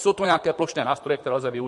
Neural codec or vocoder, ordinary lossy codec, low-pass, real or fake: vocoder, 44.1 kHz, 128 mel bands, Pupu-Vocoder; MP3, 48 kbps; 14.4 kHz; fake